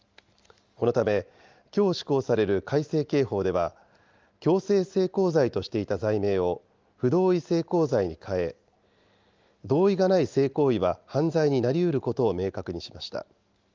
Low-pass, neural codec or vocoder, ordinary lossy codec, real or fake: 7.2 kHz; none; Opus, 32 kbps; real